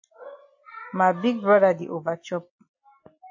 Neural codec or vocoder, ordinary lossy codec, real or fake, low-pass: none; AAC, 48 kbps; real; 7.2 kHz